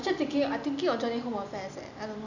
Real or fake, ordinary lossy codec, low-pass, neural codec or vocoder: real; none; 7.2 kHz; none